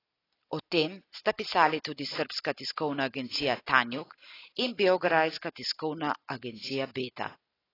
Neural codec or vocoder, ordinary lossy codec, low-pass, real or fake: none; AAC, 24 kbps; 5.4 kHz; real